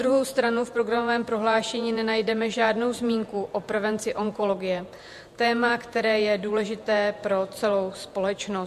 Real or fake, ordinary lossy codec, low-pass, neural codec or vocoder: fake; MP3, 64 kbps; 14.4 kHz; vocoder, 48 kHz, 128 mel bands, Vocos